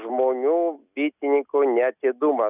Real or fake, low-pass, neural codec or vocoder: real; 3.6 kHz; none